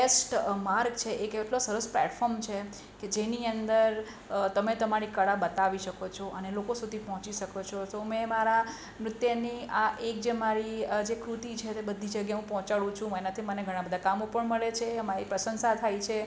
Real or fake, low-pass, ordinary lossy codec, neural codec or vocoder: real; none; none; none